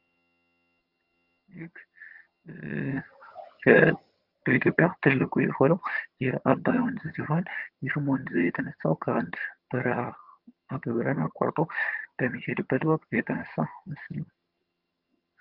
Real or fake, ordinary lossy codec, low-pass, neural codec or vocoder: fake; Opus, 32 kbps; 5.4 kHz; vocoder, 22.05 kHz, 80 mel bands, HiFi-GAN